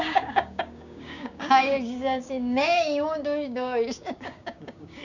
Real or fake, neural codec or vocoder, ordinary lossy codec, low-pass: fake; codec, 16 kHz, 6 kbps, DAC; none; 7.2 kHz